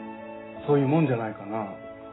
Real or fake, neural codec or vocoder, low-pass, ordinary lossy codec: real; none; 7.2 kHz; AAC, 16 kbps